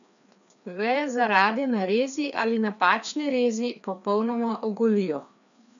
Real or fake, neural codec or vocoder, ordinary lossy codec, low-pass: fake; codec, 16 kHz, 2 kbps, FreqCodec, larger model; none; 7.2 kHz